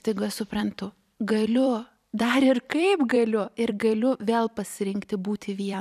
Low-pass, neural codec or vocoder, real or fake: 14.4 kHz; vocoder, 44.1 kHz, 128 mel bands every 256 samples, BigVGAN v2; fake